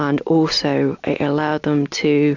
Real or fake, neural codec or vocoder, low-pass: real; none; 7.2 kHz